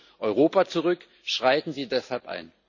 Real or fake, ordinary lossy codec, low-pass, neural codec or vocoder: real; none; 7.2 kHz; none